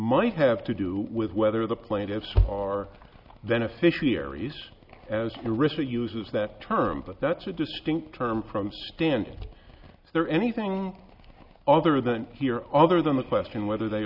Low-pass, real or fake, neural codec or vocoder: 5.4 kHz; real; none